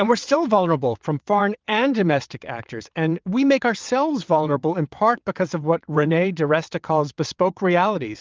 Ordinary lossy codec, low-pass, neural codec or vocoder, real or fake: Opus, 32 kbps; 7.2 kHz; vocoder, 22.05 kHz, 80 mel bands, WaveNeXt; fake